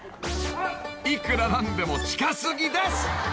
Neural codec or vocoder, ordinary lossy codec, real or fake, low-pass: none; none; real; none